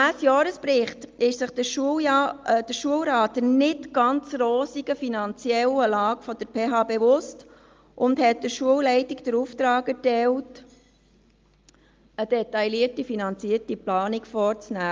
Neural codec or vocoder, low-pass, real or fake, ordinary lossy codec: none; 7.2 kHz; real; Opus, 24 kbps